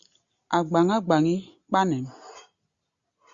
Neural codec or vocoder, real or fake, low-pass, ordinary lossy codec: none; real; 7.2 kHz; Opus, 64 kbps